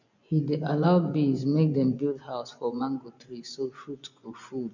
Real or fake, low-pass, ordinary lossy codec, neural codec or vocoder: fake; 7.2 kHz; none; vocoder, 24 kHz, 100 mel bands, Vocos